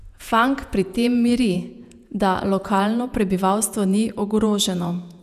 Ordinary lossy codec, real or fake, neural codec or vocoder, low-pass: none; fake; vocoder, 48 kHz, 128 mel bands, Vocos; 14.4 kHz